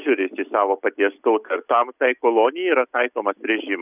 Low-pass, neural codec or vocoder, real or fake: 3.6 kHz; none; real